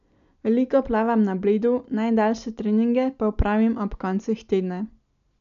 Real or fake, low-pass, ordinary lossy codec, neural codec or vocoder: real; 7.2 kHz; MP3, 96 kbps; none